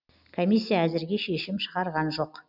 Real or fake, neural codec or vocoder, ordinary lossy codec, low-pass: real; none; none; 5.4 kHz